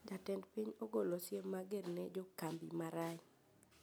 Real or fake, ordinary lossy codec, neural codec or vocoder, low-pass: real; none; none; none